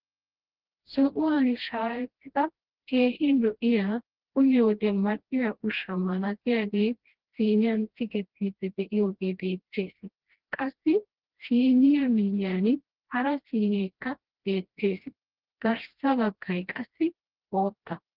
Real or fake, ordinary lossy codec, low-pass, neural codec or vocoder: fake; Opus, 16 kbps; 5.4 kHz; codec, 16 kHz, 1 kbps, FreqCodec, smaller model